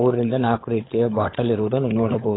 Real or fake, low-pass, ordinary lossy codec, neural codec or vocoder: fake; 7.2 kHz; AAC, 16 kbps; codec, 16 kHz, 16 kbps, FunCodec, trained on LibriTTS, 50 frames a second